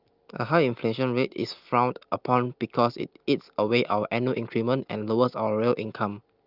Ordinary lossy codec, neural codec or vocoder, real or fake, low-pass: Opus, 24 kbps; none; real; 5.4 kHz